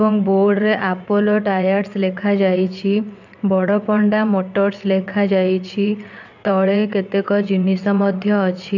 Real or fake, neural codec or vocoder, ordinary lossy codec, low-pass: fake; vocoder, 22.05 kHz, 80 mel bands, WaveNeXt; none; 7.2 kHz